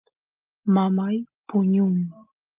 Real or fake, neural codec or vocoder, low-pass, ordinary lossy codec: real; none; 3.6 kHz; Opus, 24 kbps